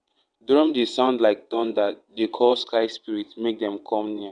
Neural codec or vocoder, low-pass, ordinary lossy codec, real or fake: vocoder, 22.05 kHz, 80 mel bands, WaveNeXt; 9.9 kHz; none; fake